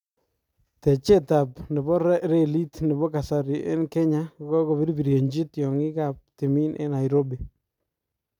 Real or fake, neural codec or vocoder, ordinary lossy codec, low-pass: real; none; none; 19.8 kHz